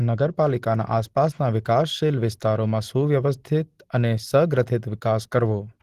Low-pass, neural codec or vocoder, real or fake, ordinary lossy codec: 14.4 kHz; none; real; Opus, 16 kbps